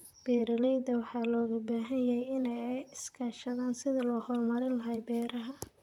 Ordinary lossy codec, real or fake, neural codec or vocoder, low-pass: none; fake; vocoder, 44.1 kHz, 128 mel bands, Pupu-Vocoder; 19.8 kHz